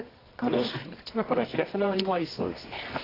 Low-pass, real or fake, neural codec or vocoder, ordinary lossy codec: 5.4 kHz; fake; codec, 24 kHz, 0.9 kbps, WavTokenizer, medium music audio release; AAC, 24 kbps